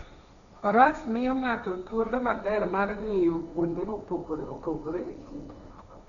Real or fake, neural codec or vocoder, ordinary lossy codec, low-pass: fake; codec, 16 kHz, 1.1 kbps, Voila-Tokenizer; none; 7.2 kHz